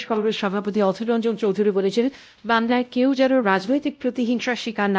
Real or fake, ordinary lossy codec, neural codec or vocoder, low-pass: fake; none; codec, 16 kHz, 0.5 kbps, X-Codec, WavLM features, trained on Multilingual LibriSpeech; none